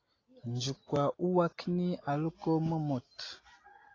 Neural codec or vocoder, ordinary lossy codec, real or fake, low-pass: none; AAC, 32 kbps; real; 7.2 kHz